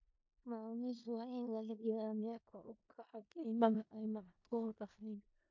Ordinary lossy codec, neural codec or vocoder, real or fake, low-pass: MP3, 48 kbps; codec, 16 kHz in and 24 kHz out, 0.4 kbps, LongCat-Audio-Codec, four codebook decoder; fake; 7.2 kHz